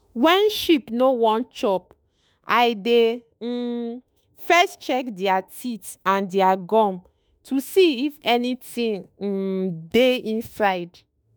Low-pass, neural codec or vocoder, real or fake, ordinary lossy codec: none; autoencoder, 48 kHz, 32 numbers a frame, DAC-VAE, trained on Japanese speech; fake; none